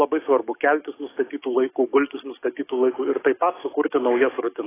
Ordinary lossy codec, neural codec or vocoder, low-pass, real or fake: AAC, 16 kbps; codec, 44.1 kHz, 7.8 kbps, DAC; 3.6 kHz; fake